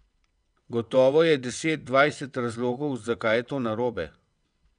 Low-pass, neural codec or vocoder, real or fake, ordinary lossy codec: 9.9 kHz; vocoder, 22.05 kHz, 80 mel bands, WaveNeXt; fake; none